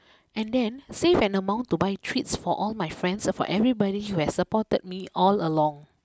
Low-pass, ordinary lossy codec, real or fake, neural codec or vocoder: none; none; real; none